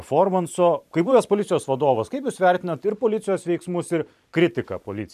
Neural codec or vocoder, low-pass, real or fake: vocoder, 44.1 kHz, 128 mel bands every 512 samples, BigVGAN v2; 14.4 kHz; fake